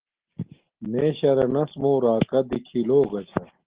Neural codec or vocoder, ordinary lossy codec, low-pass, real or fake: none; Opus, 16 kbps; 3.6 kHz; real